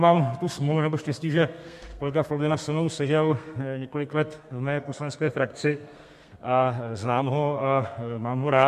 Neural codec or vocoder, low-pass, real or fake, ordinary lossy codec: codec, 32 kHz, 1.9 kbps, SNAC; 14.4 kHz; fake; MP3, 64 kbps